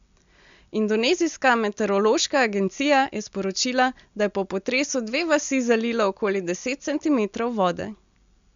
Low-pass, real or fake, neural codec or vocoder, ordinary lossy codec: 7.2 kHz; real; none; MP3, 64 kbps